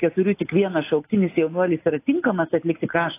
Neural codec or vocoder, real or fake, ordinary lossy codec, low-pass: none; real; AAC, 24 kbps; 3.6 kHz